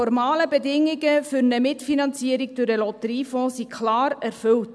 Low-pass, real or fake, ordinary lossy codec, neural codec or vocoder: none; real; none; none